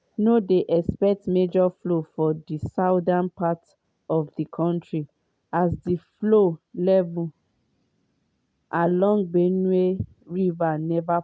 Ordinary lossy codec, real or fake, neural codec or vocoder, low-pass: none; real; none; none